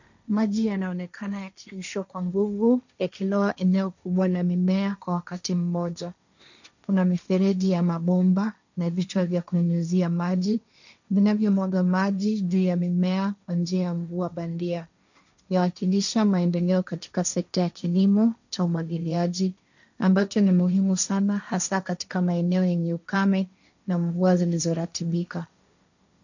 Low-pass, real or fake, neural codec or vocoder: 7.2 kHz; fake; codec, 16 kHz, 1.1 kbps, Voila-Tokenizer